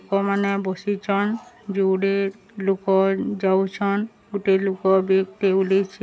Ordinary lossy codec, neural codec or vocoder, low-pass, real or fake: none; none; none; real